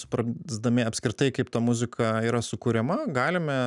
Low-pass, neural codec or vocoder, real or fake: 10.8 kHz; none; real